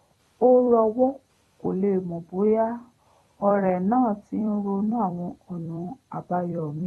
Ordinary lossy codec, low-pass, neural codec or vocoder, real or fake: AAC, 32 kbps; 19.8 kHz; vocoder, 44.1 kHz, 128 mel bands every 256 samples, BigVGAN v2; fake